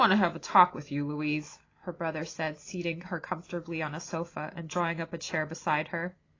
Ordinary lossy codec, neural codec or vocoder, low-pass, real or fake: AAC, 32 kbps; none; 7.2 kHz; real